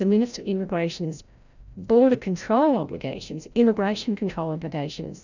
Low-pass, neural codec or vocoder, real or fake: 7.2 kHz; codec, 16 kHz, 0.5 kbps, FreqCodec, larger model; fake